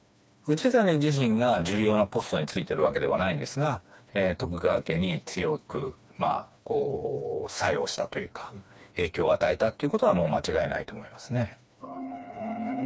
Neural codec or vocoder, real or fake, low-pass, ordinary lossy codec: codec, 16 kHz, 2 kbps, FreqCodec, smaller model; fake; none; none